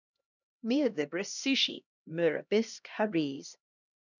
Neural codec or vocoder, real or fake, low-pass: codec, 16 kHz, 1 kbps, X-Codec, HuBERT features, trained on LibriSpeech; fake; 7.2 kHz